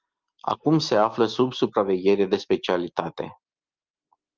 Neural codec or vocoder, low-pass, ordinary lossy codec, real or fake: none; 7.2 kHz; Opus, 32 kbps; real